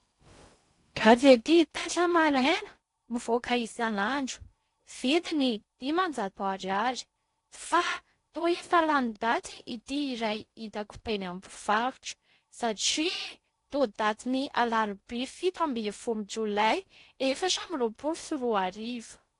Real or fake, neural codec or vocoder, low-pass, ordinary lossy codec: fake; codec, 16 kHz in and 24 kHz out, 0.6 kbps, FocalCodec, streaming, 2048 codes; 10.8 kHz; AAC, 48 kbps